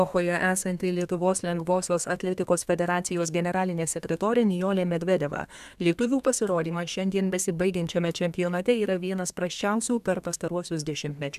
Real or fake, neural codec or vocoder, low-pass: fake; codec, 32 kHz, 1.9 kbps, SNAC; 14.4 kHz